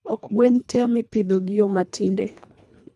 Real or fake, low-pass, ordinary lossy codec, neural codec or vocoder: fake; none; none; codec, 24 kHz, 1.5 kbps, HILCodec